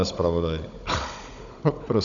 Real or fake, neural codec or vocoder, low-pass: fake; codec, 16 kHz, 16 kbps, FunCodec, trained on Chinese and English, 50 frames a second; 7.2 kHz